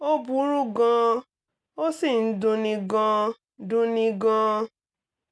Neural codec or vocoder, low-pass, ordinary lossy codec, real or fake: none; none; none; real